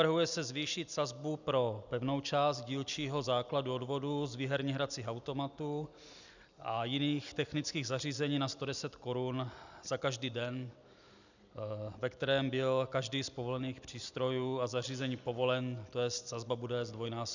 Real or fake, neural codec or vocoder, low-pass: real; none; 7.2 kHz